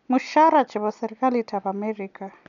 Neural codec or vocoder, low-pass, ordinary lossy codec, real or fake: none; 7.2 kHz; none; real